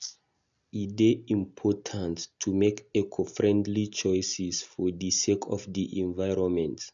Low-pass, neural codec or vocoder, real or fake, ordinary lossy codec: 7.2 kHz; none; real; none